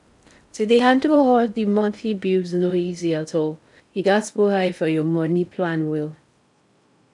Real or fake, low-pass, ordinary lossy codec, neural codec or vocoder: fake; 10.8 kHz; none; codec, 16 kHz in and 24 kHz out, 0.6 kbps, FocalCodec, streaming, 4096 codes